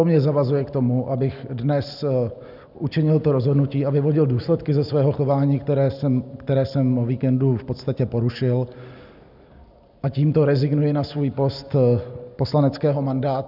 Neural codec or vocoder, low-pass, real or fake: none; 5.4 kHz; real